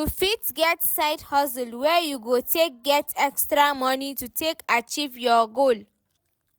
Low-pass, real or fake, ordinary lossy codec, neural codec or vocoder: none; real; none; none